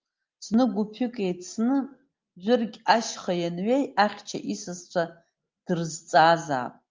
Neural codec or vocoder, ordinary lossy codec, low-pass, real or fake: none; Opus, 24 kbps; 7.2 kHz; real